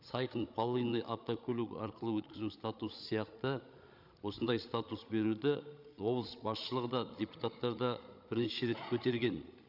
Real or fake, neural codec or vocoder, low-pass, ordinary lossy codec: fake; codec, 16 kHz, 16 kbps, FreqCodec, larger model; 5.4 kHz; none